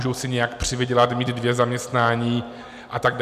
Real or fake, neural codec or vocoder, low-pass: real; none; 14.4 kHz